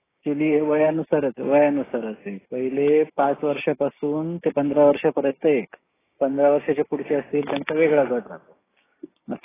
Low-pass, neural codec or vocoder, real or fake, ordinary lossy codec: 3.6 kHz; codec, 16 kHz, 6 kbps, DAC; fake; AAC, 16 kbps